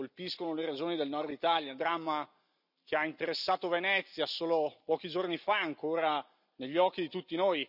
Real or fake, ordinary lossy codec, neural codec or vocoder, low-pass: real; none; none; 5.4 kHz